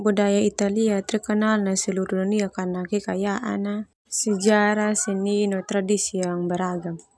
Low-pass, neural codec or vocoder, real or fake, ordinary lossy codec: none; none; real; none